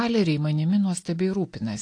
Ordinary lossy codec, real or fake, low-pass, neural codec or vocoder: AAC, 48 kbps; real; 9.9 kHz; none